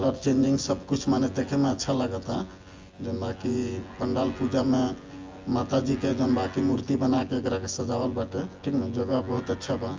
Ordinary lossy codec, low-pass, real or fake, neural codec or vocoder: Opus, 32 kbps; 7.2 kHz; fake; vocoder, 24 kHz, 100 mel bands, Vocos